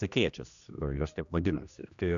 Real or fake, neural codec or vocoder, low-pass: fake; codec, 16 kHz, 1 kbps, X-Codec, HuBERT features, trained on general audio; 7.2 kHz